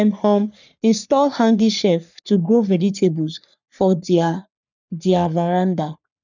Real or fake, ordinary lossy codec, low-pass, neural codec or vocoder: fake; none; 7.2 kHz; codec, 44.1 kHz, 3.4 kbps, Pupu-Codec